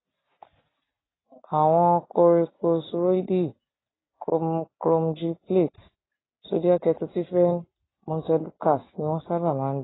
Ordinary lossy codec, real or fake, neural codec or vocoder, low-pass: AAC, 16 kbps; real; none; 7.2 kHz